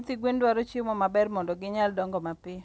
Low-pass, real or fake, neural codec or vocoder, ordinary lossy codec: none; real; none; none